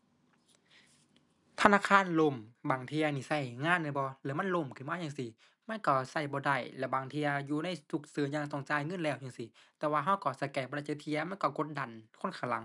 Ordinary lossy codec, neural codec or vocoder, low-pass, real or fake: none; none; 10.8 kHz; real